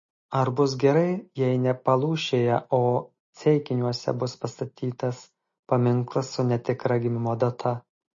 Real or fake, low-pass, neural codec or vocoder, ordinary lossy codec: real; 7.2 kHz; none; MP3, 32 kbps